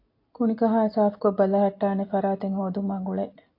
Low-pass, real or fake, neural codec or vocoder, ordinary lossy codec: 5.4 kHz; real; none; AAC, 32 kbps